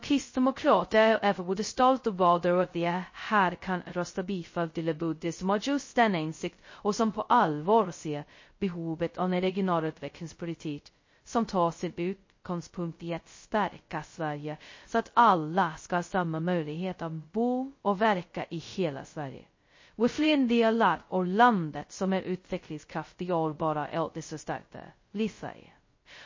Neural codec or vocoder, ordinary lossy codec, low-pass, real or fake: codec, 16 kHz, 0.2 kbps, FocalCodec; MP3, 32 kbps; 7.2 kHz; fake